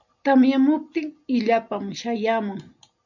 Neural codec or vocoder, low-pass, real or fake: none; 7.2 kHz; real